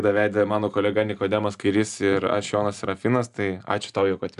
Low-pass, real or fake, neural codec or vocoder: 10.8 kHz; real; none